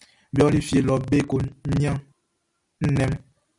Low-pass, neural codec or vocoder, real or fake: 10.8 kHz; none; real